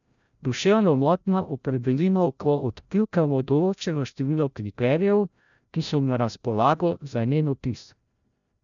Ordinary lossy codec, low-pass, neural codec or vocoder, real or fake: none; 7.2 kHz; codec, 16 kHz, 0.5 kbps, FreqCodec, larger model; fake